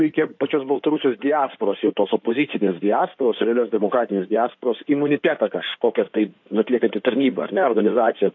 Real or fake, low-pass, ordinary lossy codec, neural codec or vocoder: fake; 7.2 kHz; AAC, 48 kbps; codec, 16 kHz in and 24 kHz out, 2.2 kbps, FireRedTTS-2 codec